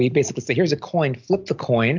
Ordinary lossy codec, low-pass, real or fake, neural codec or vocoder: MP3, 64 kbps; 7.2 kHz; real; none